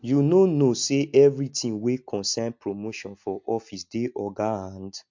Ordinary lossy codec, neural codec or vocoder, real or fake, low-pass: MP3, 48 kbps; none; real; 7.2 kHz